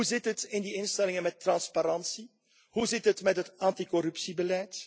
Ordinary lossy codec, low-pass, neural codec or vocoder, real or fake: none; none; none; real